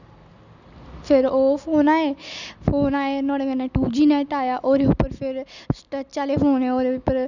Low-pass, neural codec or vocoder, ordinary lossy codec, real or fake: 7.2 kHz; none; none; real